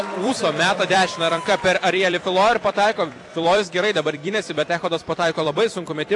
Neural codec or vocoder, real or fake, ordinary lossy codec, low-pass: vocoder, 44.1 kHz, 128 mel bands every 256 samples, BigVGAN v2; fake; AAC, 48 kbps; 10.8 kHz